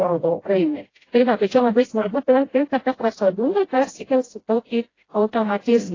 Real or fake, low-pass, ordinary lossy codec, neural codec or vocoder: fake; 7.2 kHz; AAC, 32 kbps; codec, 16 kHz, 0.5 kbps, FreqCodec, smaller model